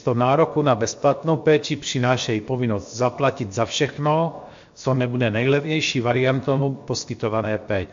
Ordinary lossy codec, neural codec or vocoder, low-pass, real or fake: MP3, 48 kbps; codec, 16 kHz, 0.7 kbps, FocalCodec; 7.2 kHz; fake